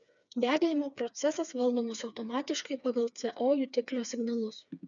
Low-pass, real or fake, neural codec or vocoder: 7.2 kHz; fake; codec, 16 kHz, 4 kbps, FreqCodec, smaller model